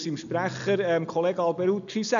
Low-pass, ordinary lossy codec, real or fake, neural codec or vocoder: 7.2 kHz; none; real; none